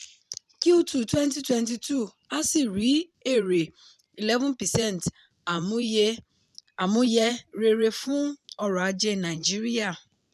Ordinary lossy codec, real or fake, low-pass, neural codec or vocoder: none; fake; 14.4 kHz; vocoder, 44.1 kHz, 128 mel bands every 512 samples, BigVGAN v2